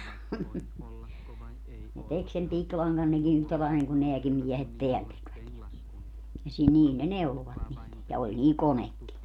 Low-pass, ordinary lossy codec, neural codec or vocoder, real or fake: 19.8 kHz; none; none; real